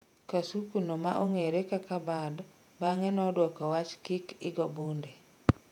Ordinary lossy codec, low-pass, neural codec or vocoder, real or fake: none; 19.8 kHz; vocoder, 48 kHz, 128 mel bands, Vocos; fake